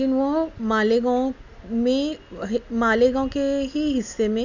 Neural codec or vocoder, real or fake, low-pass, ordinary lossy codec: none; real; 7.2 kHz; none